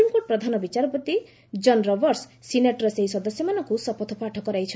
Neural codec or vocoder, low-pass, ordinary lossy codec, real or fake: none; none; none; real